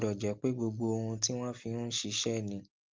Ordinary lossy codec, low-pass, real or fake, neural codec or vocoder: Opus, 32 kbps; 7.2 kHz; real; none